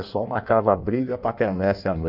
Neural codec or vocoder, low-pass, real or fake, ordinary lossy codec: codec, 16 kHz in and 24 kHz out, 1.1 kbps, FireRedTTS-2 codec; 5.4 kHz; fake; Opus, 64 kbps